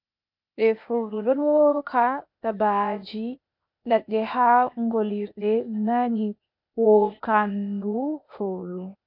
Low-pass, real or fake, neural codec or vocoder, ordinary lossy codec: 5.4 kHz; fake; codec, 16 kHz, 0.8 kbps, ZipCodec; MP3, 48 kbps